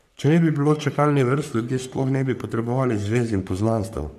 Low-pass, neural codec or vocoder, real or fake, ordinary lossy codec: 14.4 kHz; codec, 44.1 kHz, 3.4 kbps, Pupu-Codec; fake; none